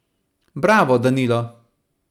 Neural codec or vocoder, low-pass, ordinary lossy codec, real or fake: none; 19.8 kHz; none; real